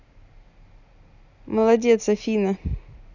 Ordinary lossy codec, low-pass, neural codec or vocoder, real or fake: none; 7.2 kHz; none; real